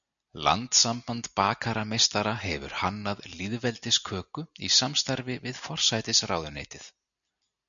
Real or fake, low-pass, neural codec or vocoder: real; 7.2 kHz; none